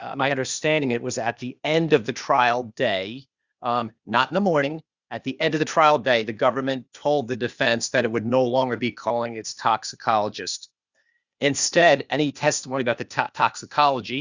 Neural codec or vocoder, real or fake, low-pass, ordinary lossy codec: codec, 16 kHz, 0.8 kbps, ZipCodec; fake; 7.2 kHz; Opus, 64 kbps